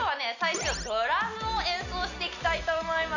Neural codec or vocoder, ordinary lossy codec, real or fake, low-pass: none; none; real; 7.2 kHz